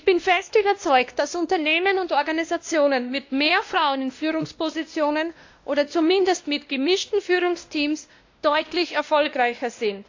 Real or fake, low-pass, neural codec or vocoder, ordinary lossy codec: fake; 7.2 kHz; codec, 16 kHz, 1 kbps, X-Codec, WavLM features, trained on Multilingual LibriSpeech; AAC, 48 kbps